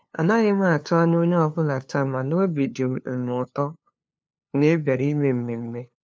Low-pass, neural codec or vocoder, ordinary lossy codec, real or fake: none; codec, 16 kHz, 2 kbps, FunCodec, trained on LibriTTS, 25 frames a second; none; fake